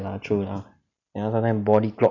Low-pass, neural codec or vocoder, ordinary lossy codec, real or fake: 7.2 kHz; none; none; real